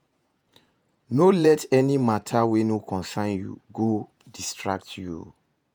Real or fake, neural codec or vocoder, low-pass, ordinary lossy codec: fake; vocoder, 48 kHz, 128 mel bands, Vocos; none; none